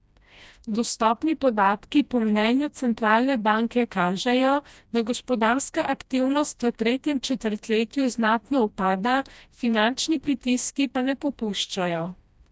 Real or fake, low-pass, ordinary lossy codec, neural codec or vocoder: fake; none; none; codec, 16 kHz, 1 kbps, FreqCodec, smaller model